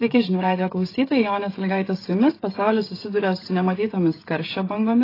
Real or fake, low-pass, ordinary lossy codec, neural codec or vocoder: fake; 5.4 kHz; AAC, 24 kbps; codec, 16 kHz, 16 kbps, FreqCodec, smaller model